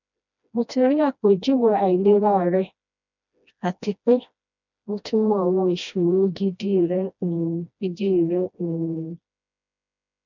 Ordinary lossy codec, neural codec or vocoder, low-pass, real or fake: none; codec, 16 kHz, 1 kbps, FreqCodec, smaller model; 7.2 kHz; fake